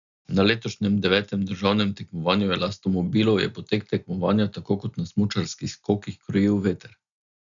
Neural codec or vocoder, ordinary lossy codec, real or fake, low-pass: none; none; real; 7.2 kHz